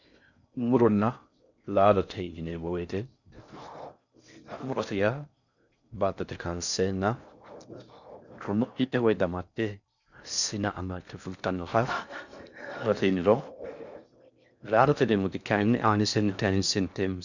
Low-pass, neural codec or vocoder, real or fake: 7.2 kHz; codec, 16 kHz in and 24 kHz out, 0.6 kbps, FocalCodec, streaming, 4096 codes; fake